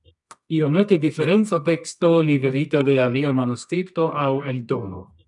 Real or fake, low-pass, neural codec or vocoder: fake; 10.8 kHz; codec, 24 kHz, 0.9 kbps, WavTokenizer, medium music audio release